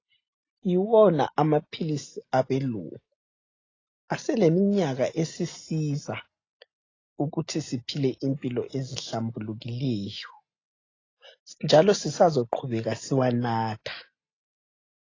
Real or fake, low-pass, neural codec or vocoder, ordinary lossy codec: real; 7.2 kHz; none; AAC, 32 kbps